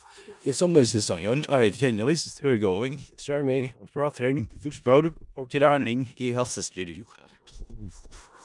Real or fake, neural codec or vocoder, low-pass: fake; codec, 16 kHz in and 24 kHz out, 0.4 kbps, LongCat-Audio-Codec, four codebook decoder; 10.8 kHz